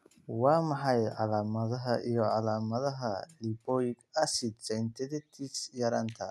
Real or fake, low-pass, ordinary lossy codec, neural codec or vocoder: real; none; none; none